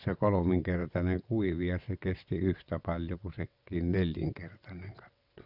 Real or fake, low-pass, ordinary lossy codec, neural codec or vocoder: fake; 5.4 kHz; none; vocoder, 22.05 kHz, 80 mel bands, WaveNeXt